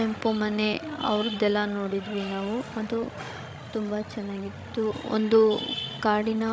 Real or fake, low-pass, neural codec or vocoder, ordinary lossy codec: fake; none; codec, 16 kHz, 16 kbps, FreqCodec, larger model; none